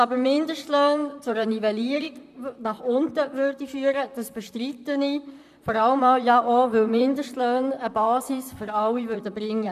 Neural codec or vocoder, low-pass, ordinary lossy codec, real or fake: vocoder, 44.1 kHz, 128 mel bands, Pupu-Vocoder; 14.4 kHz; none; fake